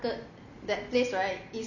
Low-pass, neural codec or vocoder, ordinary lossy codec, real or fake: 7.2 kHz; none; none; real